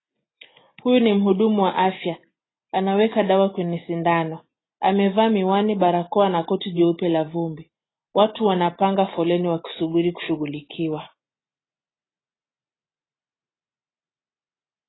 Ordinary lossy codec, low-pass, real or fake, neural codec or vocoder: AAC, 16 kbps; 7.2 kHz; real; none